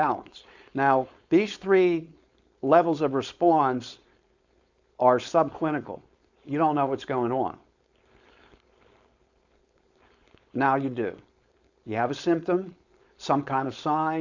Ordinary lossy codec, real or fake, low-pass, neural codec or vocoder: Opus, 64 kbps; fake; 7.2 kHz; codec, 16 kHz, 4.8 kbps, FACodec